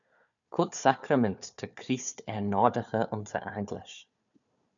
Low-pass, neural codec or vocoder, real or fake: 7.2 kHz; codec, 16 kHz, 4 kbps, FunCodec, trained on Chinese and English, 50 frames a second; fake